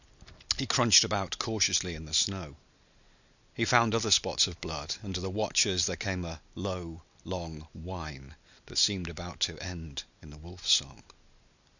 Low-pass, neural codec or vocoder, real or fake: 7.2 kHz; none; real